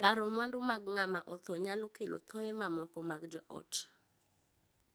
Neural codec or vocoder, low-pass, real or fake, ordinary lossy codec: codec, 44.1 kHz, 2.6 kbps, SNAC; none; fake; none